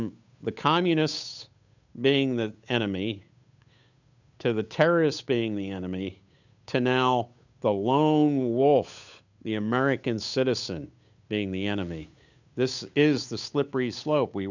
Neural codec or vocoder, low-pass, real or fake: codec, 16 kHz, 8 kbps, FunCodec, trained on Chinese and English, 25 frames a second; 7.2 kHz; fake